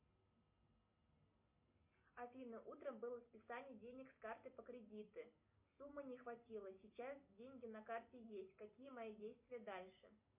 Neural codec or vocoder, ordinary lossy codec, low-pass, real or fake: none; MP3, 32 kbps; 3.6 kHz; real